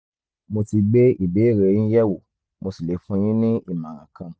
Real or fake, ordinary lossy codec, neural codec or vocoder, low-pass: real; none; none; none